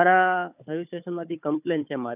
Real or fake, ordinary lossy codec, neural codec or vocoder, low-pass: fake; none; codec, 16 kHz, 4 kbps, FunCodec, trained on Chinese and English, 50 frames a second; 3.6 kHz